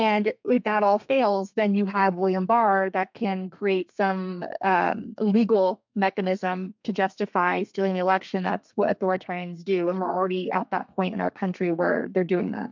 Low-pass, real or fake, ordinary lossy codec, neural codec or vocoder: 7.2 kHz; fake; MP3, 64 kbps; codec, 32 kHz, 1.9 kbps, SNAC